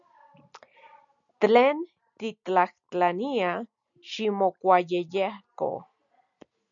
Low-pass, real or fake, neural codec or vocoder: 7.2 kHz; real; none